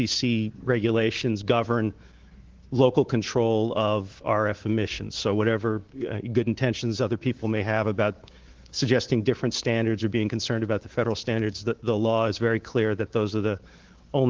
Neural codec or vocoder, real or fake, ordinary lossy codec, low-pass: codec, 24 kHz, 3.1 kbps, DualCodec; fake; Opus, 16 kbps; 7.2 kHz